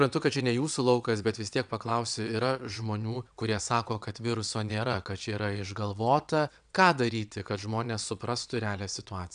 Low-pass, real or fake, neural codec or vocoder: 9.9 kHz; fake; vocoder, 22.05 kHz, 80 mel bands, WaveNeXt